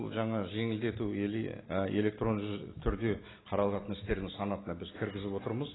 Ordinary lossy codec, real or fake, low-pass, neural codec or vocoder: AAC, 16 kbps; real; 7.2 kHz; none